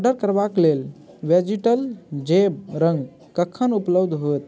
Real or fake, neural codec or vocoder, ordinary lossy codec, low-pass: real; none; none; none